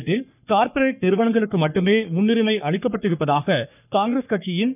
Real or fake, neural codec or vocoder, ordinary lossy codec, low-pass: fake; codec, 44.1 kHz, 3.4 kbps, Pupu-Codec; none; 3.6 kHz